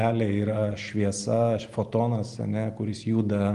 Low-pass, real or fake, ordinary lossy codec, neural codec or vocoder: 10.8 kHz; real; Opus, 24 kbps; none